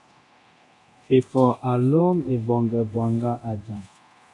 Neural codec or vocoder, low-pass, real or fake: codec, 24 kHz, 0.9 kbps, DualCodec; 10.8 kHz; fake